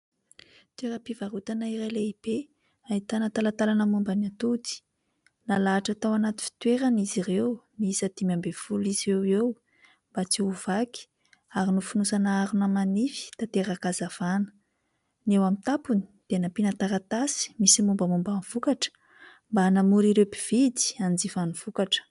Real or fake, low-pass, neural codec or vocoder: real; 10.8 kHz; none